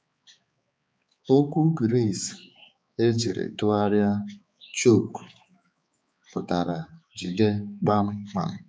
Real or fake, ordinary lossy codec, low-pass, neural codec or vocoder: fake; none; none; codec, 16 kHz, 4 kbps, X-Codec, HuBERT features, trained on balanced general audio